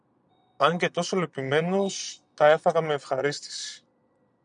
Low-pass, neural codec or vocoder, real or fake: 9.9 kHz; vocoder, 22.05 kHz, 80 mel bands, Vocos; fake